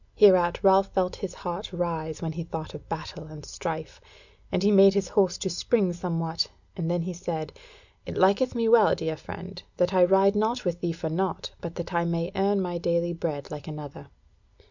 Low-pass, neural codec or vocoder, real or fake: 7.2 kHz; none; real